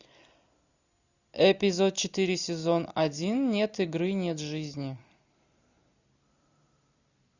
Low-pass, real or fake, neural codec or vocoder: 7.2 kHz; real; none